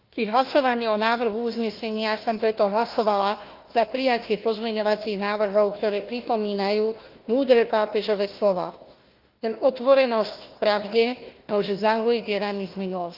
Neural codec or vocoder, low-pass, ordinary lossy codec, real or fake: codec, 16 kHz, 1 kbps, FunCodec, trained on Chinese and English, 50 frames a second; 5.4 kHz; Opus, 32 kbps; fake